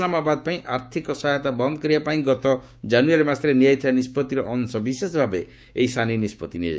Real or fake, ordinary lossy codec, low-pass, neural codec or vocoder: fake; none; none; codec, 16 kHz, 6 kbps, DAC